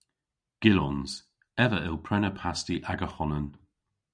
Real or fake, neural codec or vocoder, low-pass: real; none; 9.9 kHz